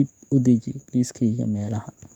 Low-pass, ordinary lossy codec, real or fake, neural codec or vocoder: 19.8 kHz; none; fake; codec, 44.1 kHz, 7.8 kbps, DAC